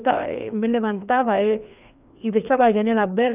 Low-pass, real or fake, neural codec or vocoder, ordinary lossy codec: 3.6 kHz; fake; codec, 16 kHz, 2 kbps, FreqCodec, larger model; none